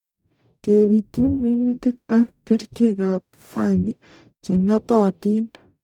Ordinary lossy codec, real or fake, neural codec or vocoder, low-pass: none; fake; codec, 44.1 kHz, 0.9 kbps, DAC; 19.8 kHz